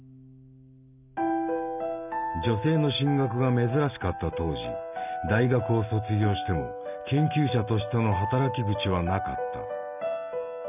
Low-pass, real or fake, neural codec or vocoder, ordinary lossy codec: 3.6 kHz; real; none; none